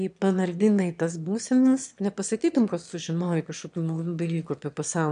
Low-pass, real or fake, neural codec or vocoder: 9.9 kHz; fake; autoencoder, 22.05 kHz, a latent of 192 numbers a frame, VITS, trained on one speaker